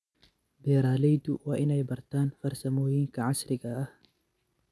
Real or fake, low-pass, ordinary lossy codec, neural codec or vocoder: real; none; none; none